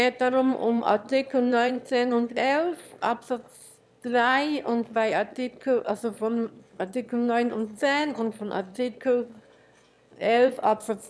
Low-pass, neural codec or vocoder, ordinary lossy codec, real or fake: none; autoencoder, 22.05 kHz, a latent of 192 numbers a frame, VITS, trained on one speaker; none; fake